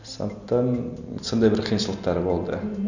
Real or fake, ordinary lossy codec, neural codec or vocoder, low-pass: real; none; none; 7.2 kHz